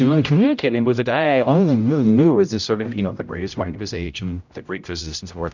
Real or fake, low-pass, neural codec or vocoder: fake; 7.2 kHz; codec, 16 kHz, 0.5 kbps, X-Codec, HuBERT features, trained on general audio